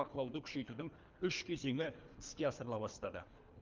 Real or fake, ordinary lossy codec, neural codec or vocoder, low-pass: fake; Opus, 32 kbps; codec, 24 kHz, 3 kbps, HILCodec; 7.2 kHz